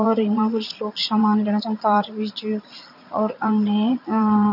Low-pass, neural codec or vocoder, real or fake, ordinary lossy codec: 5.4 kHz; vocoder, 22.05 kHz, 80 mel bands, Vocos; fake; none